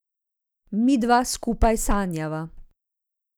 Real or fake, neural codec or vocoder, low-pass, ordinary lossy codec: real; none; none; none